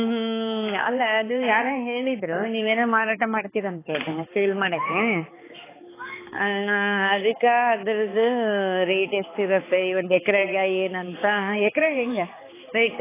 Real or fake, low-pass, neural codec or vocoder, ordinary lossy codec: fake; 3.6 kHz; codec, 16 kHz, 4 kbps, X-Codec, HuBERT features, trained on balanced general audio; AAC, 16 kbps